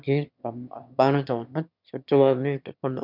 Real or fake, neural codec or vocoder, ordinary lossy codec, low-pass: fake; autoencoder, 22.05 kHz, a latent of 192 numbers a frame, VITS, trained on one speaker; none; 5.4 kHz